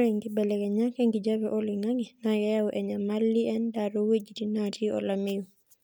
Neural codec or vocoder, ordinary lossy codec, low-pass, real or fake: none; none; none; real